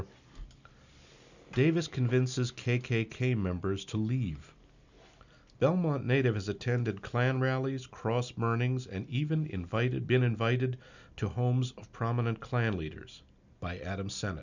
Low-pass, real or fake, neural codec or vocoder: 7.2 kHz; real; none